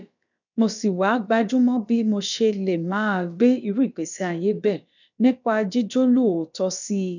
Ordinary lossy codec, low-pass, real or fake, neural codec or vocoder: none; 7.2 kHz; fake; codec, 16 kHz, about 1 kbps, DyCAST, with the encoder's durations